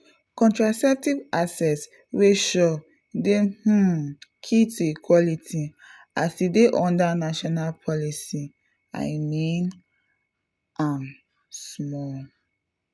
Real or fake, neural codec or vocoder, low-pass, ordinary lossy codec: real; none; none; none